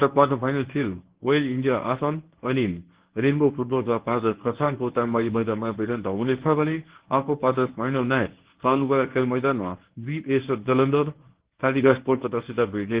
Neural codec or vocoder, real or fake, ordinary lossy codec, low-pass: codec, 24 kHz, 0.9 kbps, WavTokenizer, medium speech release version 1; fake; Opus, 16 kbps; 3.6 kHz